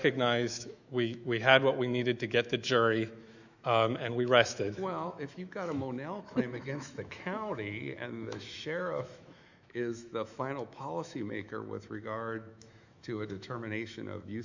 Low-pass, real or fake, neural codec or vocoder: 7.2 kHz; fake; autoencoder, 48 kHz, 128 numbers a frame, DAC-VAE, trained on Japanese speech